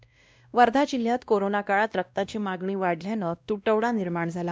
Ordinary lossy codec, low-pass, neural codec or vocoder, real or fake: none; none; codec, 16 kHz, 1 kbps, X-Codec, WavLM features, trained on Multilingual LibriSpeech; fake